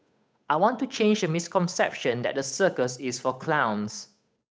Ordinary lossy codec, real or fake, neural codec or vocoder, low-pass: none; fake; codec, 16 kHz, 8 kbps, FunCodec, trained on Chinese and English, 25 frames a second; none